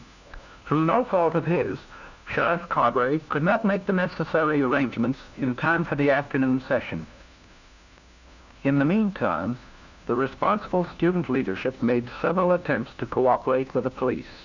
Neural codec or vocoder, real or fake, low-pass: codec, 16 kHz, 1 kbps, FunCodec, trained on LibriTTS, 50 frames a second; fake; 7.2 kHz